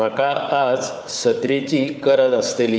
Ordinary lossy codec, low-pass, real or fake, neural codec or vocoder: none; none; fake; codec, 16 kHz, 4 kbps, FunCodec, trained on Chinese and English, 50 frames a second